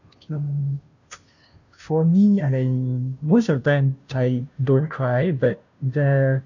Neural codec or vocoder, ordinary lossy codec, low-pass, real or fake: codec, 16 kHz, 0.5 kbps, FunCodec, trained on Chinese and English, 25 frames a second; none; 7.2 kHz; fake